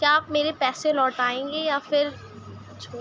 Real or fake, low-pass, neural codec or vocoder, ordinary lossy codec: real; none; none; none